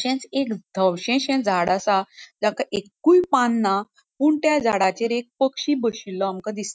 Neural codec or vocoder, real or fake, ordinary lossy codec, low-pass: none; real; none; none